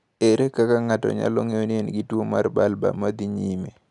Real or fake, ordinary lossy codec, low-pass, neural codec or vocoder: real; none; 10.8 kHz; none